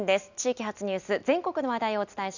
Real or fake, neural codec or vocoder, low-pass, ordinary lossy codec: real; none; 7.2 kHz; none